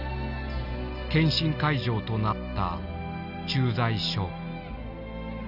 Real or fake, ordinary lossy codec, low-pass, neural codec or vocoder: real; none; 5.4 kHz; none